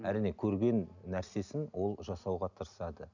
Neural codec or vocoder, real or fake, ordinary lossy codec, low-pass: none; real; none; 7.2 kHz